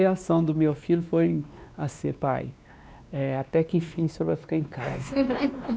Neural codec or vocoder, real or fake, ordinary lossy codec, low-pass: codec, 16 kHz, 2 kbps, X-Codec, WavLM features, trained on Multilingual LibriSpeech; fake; none; none